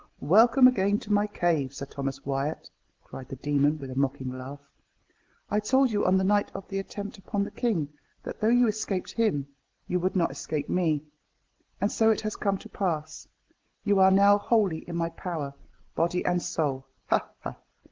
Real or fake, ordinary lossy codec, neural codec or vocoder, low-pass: real; Opus, 16 kbps; none; 7.2 kHz